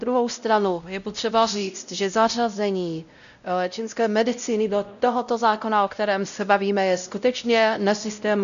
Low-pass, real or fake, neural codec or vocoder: 7.2 kHz; fake; codec, 16 kHz, 0.5 kbps, X-Codec, WavLM features, trained on Multilingual LibriSpeech